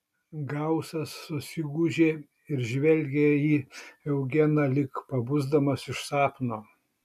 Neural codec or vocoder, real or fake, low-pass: none; real; 14.4 kHz